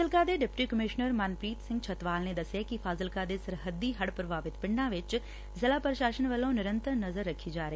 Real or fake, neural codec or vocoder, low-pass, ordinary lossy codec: real; none; none; none